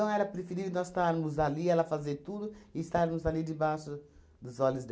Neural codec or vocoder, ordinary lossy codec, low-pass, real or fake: none; none; none; real